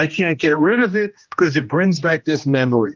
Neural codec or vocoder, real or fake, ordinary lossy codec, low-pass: codec, 16 kHz, 1 kbps, X-Codec, HuBERT features, trained on general audio; fake; Opus, 24 kbps; 7.2 kHz